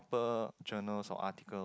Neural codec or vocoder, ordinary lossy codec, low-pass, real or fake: none; none; none; real